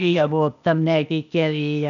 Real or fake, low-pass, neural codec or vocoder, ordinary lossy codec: fake; 7.2 kHz; codec, 16 kHz, 0.8 kbps, ZipCodec; none